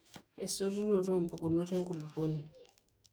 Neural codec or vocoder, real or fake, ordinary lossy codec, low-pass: codec, 44.1 kHz, 2.6 kbps, DAC; fake; none; none